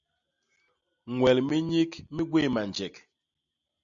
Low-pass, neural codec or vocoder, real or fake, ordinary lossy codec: 7.2 kHz; none; real; Opus, 64 kbps